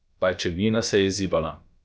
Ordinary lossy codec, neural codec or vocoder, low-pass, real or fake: none; codec, 16 kHz, about 1 kbps, DyCAST, with the encoder's durations; none; fake